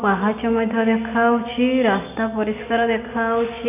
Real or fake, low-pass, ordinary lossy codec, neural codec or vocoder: real; 3.6 kHz; none; none